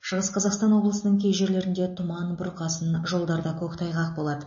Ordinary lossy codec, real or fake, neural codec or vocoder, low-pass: MP3, 32 kbps; real; none; 7.2 kHz